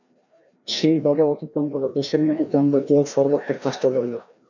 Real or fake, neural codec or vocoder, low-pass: fake; codec, 16 kHz, 1 kbps, FreqCodec, larger model; 7.2 kHz